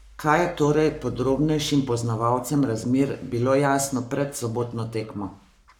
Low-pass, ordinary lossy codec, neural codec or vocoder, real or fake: 19.8 kHz; none; codec, 44.1 kHz, 7.8 kbps, Pupu-Codec; fake